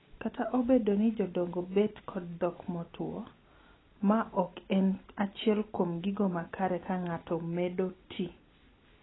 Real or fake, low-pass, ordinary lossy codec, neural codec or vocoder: real; 7.2 kHz; AAC, 16 kbps; none